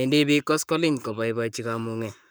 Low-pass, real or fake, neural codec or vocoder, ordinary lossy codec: none; fake; codec, 44.1 kHz, 7.8 kbps, Pupu-Codec; none